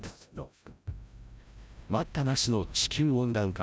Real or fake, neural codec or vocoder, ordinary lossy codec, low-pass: fake; codec, 16 kHz, 0.5 kbps, FreqCodec, larger model; none; none